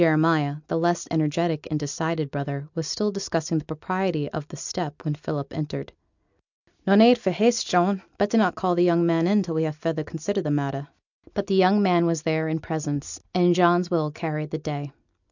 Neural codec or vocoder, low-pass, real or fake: none; 7.2 kHz; real